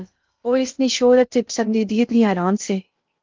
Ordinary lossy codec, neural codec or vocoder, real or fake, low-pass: Opus, 24 kbps; codec, 16 kHz in and 24 kHz out, 0.6 kbps, FocalCodec, streaming, 2048 codes; fake; 7.2 kHz